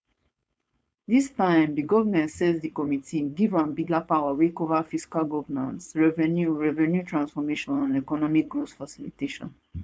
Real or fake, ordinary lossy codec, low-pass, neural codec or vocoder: fake; none; none; codec, 16 kHz, 4.8 kbps, FACodec